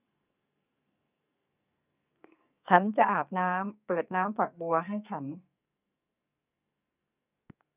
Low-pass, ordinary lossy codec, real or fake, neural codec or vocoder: 3.6 kHz; none; fake; codec, 44.1 kHz, 2.6 kbps, SNAC